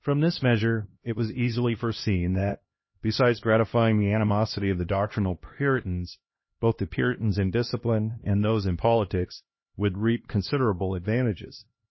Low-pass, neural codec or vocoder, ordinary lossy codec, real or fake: 7.2 kHz; codec, 16 kHz, 1 kbps, X-Codec, HuBERT features, trained on LibriSpeech; MP3, 24 kbps; fake